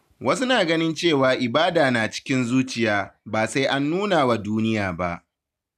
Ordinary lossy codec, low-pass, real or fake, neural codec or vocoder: none; 14.4 kHz; real; none